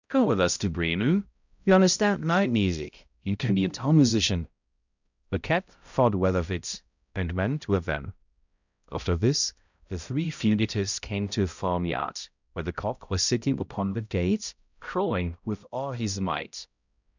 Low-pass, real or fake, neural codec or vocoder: 7.2 kHz; fake; codec, 16 kHz, 0.5 kbps, X-Codec, HuBERT features, trained on balanced general audio